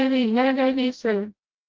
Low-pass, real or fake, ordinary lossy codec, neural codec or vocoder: 7.2 kHz; fake; Opus, 24 kbps; codec, 16 kHz, 0.5 kbps, FreqCodec, smaller model